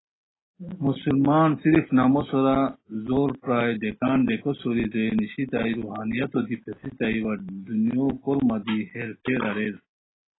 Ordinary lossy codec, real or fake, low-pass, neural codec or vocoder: AAC, 16 kbps; real; 7.2 kHz; none